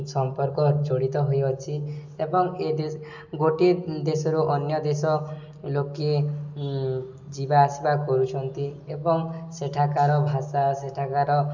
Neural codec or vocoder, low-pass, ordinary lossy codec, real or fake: none; 7.2 kHz; none; real